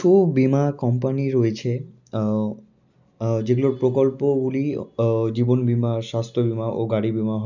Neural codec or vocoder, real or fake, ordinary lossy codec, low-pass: none; real; none; 7.2 kHz